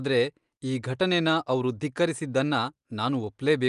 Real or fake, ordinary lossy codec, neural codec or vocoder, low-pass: real; Opus, 32 kbps; none; 10.8 kHz